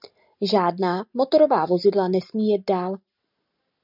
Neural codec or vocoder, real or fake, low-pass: none; real; 5.4 kHz